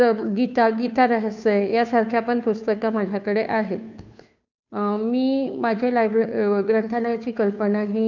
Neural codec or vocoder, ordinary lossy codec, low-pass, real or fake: codec, 16 kHz, 2 kbps, FunCodec, trained on Chinese and English, 25 frames a second; none; 7.2 kHz; fake